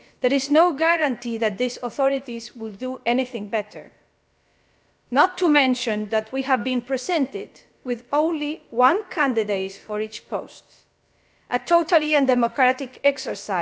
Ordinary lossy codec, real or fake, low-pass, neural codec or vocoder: none; fake; none; codec, 16 kHz, about 1 kbps, DyCAST, with the encoder's durations